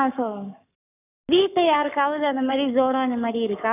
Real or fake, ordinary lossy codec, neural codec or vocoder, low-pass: fake; none; codec, 44.1 kHz, 7.8 kbps, Pupu-Codec; 3.6 kHz